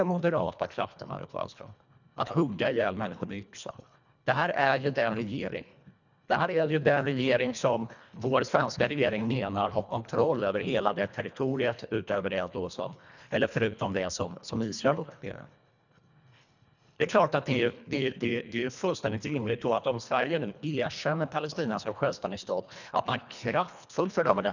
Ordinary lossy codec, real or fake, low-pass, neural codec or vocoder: none; fake; 7.2 kHz; codec, 24 kHz, 1.5 kbps, HILCodec